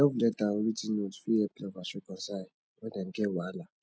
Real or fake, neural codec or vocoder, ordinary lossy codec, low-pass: real; none; none; none